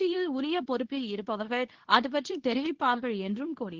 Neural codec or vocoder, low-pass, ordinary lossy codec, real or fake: codec, 24 kHz, 0.9 kbps, WavTokenizer, medium speech release version 2; 7.2 kHz; Opus, 16 kbps; fake